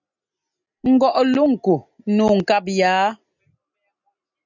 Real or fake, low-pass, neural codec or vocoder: real; 7.2 kHz; none